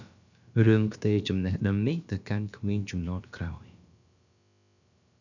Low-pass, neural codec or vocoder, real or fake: 7.2 kHz; codec, 16 kHz, about 1 kbps, DyCAST, with the encoder's durations; fake